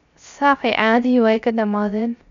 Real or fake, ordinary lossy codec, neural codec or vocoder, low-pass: fake; MP3, 64 kbps; codec, 16 kHz, 0.3 kbps, FocalCodec; 7.2 kHz